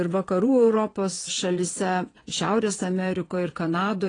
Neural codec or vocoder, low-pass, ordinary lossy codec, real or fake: vocoder, 22.05 kHz, 80 mel bands, WaveNeXt; 9.9 kHz; AAC, 32 kbps; fake